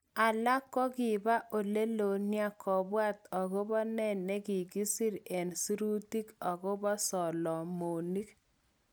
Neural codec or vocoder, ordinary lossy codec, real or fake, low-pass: none; none; real; none